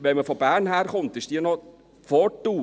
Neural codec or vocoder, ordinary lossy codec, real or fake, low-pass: none; none; real; none